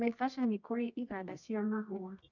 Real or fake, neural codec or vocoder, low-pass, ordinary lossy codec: fake; codec, 24 kHz, 0.9 kbps, WavTokenizer, medium music audio release; 7.2 kHz; none